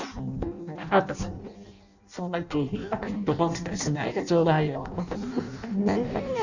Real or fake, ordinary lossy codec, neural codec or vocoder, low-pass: fake; none; codec, 16 kHz in and 24 kHz out, 0.6 kbps, FireRedTTS-2 codec; 7.2 kHz